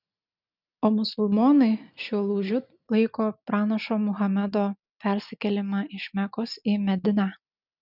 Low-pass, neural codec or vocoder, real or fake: 5.4 kHz; none; real